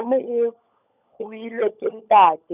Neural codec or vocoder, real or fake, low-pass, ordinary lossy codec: codec, 16 kHz, 16 kbps, FunCodec, trained on LibriTTS, 50 frames a second; fake; 3.6 kHz; none